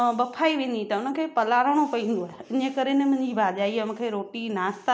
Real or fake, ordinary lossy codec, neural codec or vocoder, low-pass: real; none; none; none